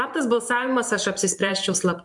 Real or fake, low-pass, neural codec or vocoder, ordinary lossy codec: fake; 10.8 kHz; vocoder, 44.1 kHz, 128 mel bands every 512 samples, BigVGAN v2; MP3, 64 kbps